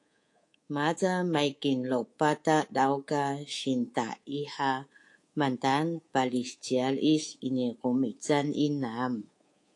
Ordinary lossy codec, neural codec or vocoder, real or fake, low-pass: AAC, 48 kbps; codec, 24 kHz, 3.1 kbps, DualCodec; fake; 10.8 kHz